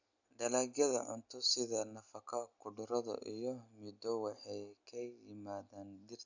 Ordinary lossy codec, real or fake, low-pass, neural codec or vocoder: none; real; 7.2 kHz; none